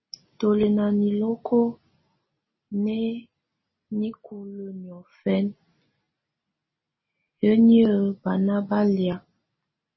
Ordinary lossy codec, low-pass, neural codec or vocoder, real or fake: MP3, 24 kbps; 7.2 kHz; none; real